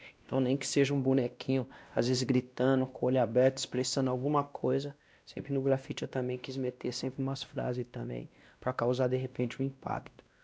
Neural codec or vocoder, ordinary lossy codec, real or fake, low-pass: codec, 16 kHz, 1 kbps, X-Codec, WavLM features, trained on Multilingual LibriSpeech; none; fake; none